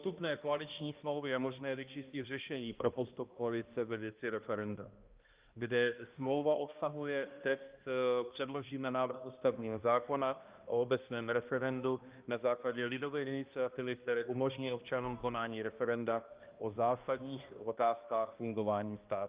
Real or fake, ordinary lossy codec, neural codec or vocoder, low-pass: fake; Opus, 24 kbps; codec, 16 kHz, 1 kbps, X-Codec, HuBERT features, trained on balanced general audio; 3.6 kHz